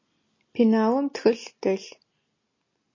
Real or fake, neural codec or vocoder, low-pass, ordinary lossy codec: real; none; 7.2 kHz; MP3, 32 kbps